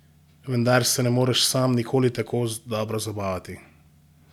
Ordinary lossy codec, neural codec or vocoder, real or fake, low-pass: none; none; real; 19.8 kHz